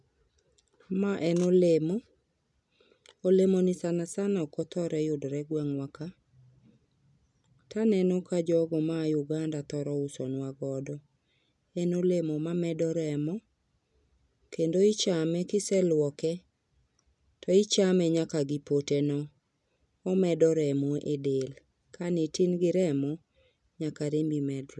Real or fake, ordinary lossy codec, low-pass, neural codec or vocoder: real; none; 10.8 kHz; none